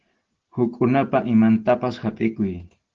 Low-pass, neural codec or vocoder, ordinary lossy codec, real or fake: 7.2 kHz; none; Opus, 16 kbps; real